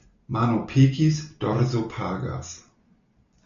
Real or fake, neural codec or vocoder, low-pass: real; none; 7.2 kHz